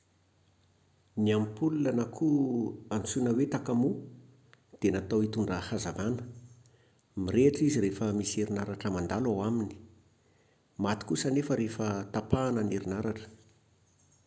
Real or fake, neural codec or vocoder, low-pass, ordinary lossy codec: real; none; none; none